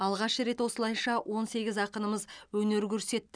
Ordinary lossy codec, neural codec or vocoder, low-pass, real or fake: none; none; 9.9 kHz; real